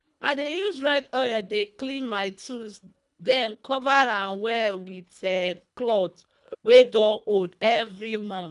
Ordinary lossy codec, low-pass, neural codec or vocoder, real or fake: none; 10.8 kHz; codec, 24 kHz, 1.5 kbps, HILCodec; fake